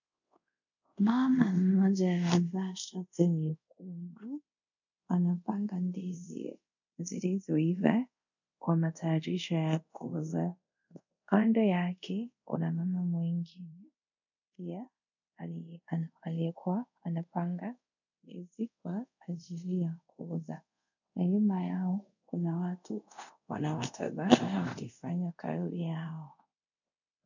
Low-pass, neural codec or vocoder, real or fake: 7.2 kHz; codec, 24 kHz, 0.5 kbps, DualCodec; fake